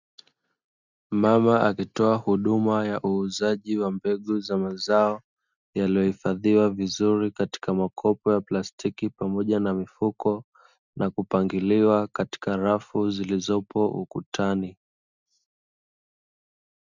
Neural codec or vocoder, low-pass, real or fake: none; 7.2 kHz; real